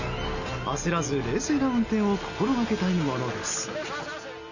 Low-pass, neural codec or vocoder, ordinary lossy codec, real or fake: 7.2 kHz; none; none; real